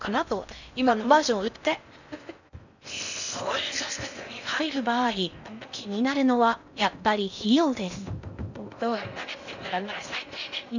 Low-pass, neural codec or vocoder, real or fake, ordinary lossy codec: 7.2 kHz; codec, 16 kHz in and 24 kHz out, 0.6 kbps, FocalCodec, streaming, 4096 codes; fake; none